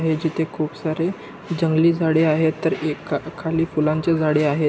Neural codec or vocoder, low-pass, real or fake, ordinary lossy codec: none; none; real; none